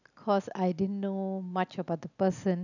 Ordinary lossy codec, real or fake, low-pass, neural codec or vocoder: none; real; 7.2 kHz; none